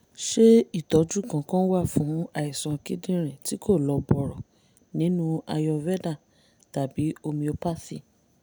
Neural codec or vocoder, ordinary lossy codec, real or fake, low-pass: none; none; real; none